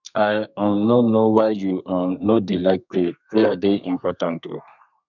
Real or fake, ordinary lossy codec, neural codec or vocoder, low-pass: fake; none; codec, 32 kHz, 1.9 kbps, SNAC; 7.2 kHz